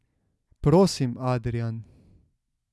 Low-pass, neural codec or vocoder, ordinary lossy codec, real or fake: none; none; none; real